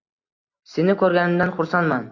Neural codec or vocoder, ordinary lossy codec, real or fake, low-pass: none; AAC, 48 kbps; real; 7.2 kHz